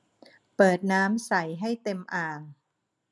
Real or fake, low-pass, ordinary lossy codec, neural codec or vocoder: real; none; none; none